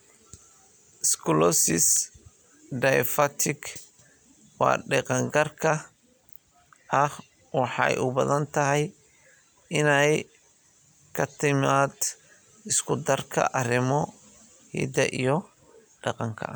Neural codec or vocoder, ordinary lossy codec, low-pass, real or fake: none; none; none; real